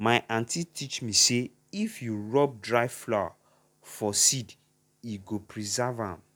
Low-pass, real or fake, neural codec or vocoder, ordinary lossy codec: none; real; none; none